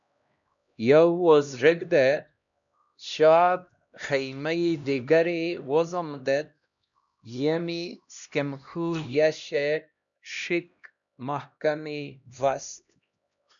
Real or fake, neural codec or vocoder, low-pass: fake; codec, 16 kHz, 1 kbps, X-Codec, HuBERT features, trained on LibriSpeech; 7.2 kHz